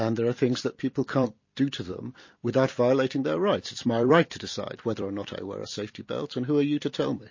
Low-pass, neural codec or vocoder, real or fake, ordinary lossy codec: 7.2 kHz; vocoder, 44.1 kHz, 128 mel bands every 256 samples, BigVGAN v2; fake; MP3, 32 kbps